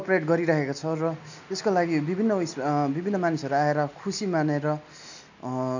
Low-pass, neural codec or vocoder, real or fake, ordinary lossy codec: 7.2 kHz; none; real; none